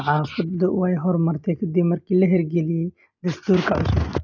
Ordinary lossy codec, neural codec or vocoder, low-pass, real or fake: none; none; 7.2 kHz; real